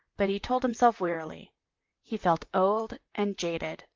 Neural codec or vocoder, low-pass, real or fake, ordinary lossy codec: vocoder, 44.1 kHz, 128 mel bands, Pupu-Vocoder; 7.2 kHz; fake; Opus, 24 kbps